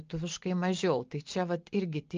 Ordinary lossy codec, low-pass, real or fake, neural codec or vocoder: Opus, 24 kbps; 7.2 kHz; real; none